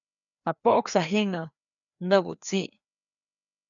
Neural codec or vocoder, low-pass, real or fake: codec, 16 kHz, 2 kbps, FreqCodec, larger model; 7.2 kHz; fake